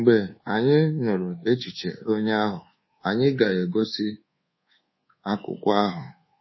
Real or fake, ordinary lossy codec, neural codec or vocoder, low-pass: fake; MP3, 24 kbps; codec, 24 kHz, 1.2 kbps, DualCodec; 7.2 kHz